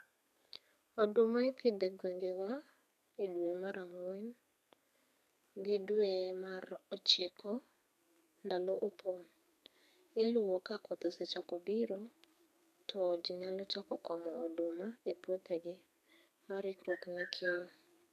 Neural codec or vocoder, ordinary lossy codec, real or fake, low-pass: codec, 32 kHz, 1.9 kbps, SNAC; none; fake; 14.4 kHz